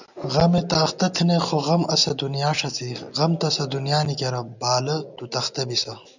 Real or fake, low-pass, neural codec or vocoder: real; 7.2 kHz; none